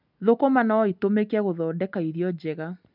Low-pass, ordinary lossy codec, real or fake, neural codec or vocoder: 5.4 kHz; none; real; none